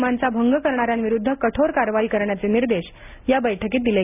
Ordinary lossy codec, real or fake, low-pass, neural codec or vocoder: none; real; 3.6 kHz; none